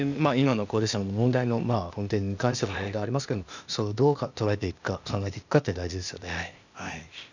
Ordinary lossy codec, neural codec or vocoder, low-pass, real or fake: none; codec, 16 kHz, 0.8 kbps, ZipCodec; 7.2 kHz; fake